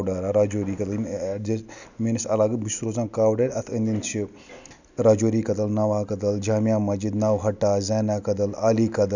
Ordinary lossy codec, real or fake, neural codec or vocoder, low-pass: none; real; none; 7.2 kHz